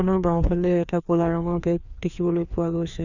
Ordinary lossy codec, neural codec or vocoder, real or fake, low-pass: none; codec, 16 kHz, 2 kbps, FreqCodec, larger model; fake; 7.2 kHz